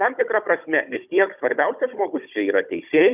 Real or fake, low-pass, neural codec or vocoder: fake; 3.6 kHz; codec, 16 kHz, 16 kbps, FunCodec, trained on Chinese and English, 50 frames a second